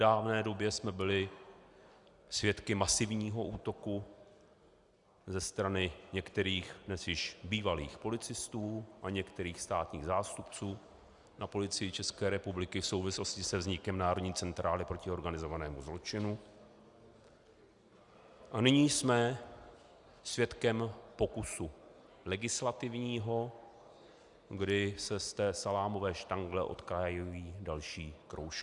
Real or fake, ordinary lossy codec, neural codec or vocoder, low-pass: real; Opus, 64 kbps; none; 10.8 kHz